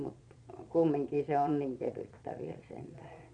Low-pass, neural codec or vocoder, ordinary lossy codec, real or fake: 9.9 kHz; vocoder, 22.05 kHz, 80 mel bands, Vocos; MP3, 64 kbps; fake